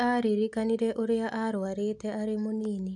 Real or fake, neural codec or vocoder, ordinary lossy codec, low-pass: real; none; none; 10.8 kHz